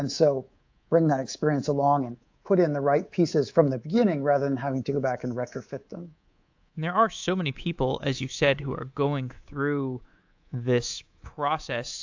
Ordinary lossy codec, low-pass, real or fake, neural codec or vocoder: AAC, 48 kbps; 7.2 kHz; fake; codec, 24 kHz, 3.1 kbps, DualCodec